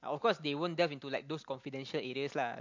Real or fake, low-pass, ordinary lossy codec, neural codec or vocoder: real; 7.2 kHz; MP3, 48 kbps; none